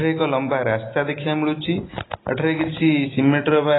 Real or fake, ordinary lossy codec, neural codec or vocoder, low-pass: real; AAC, 16 kbps; none; 7.2 kHz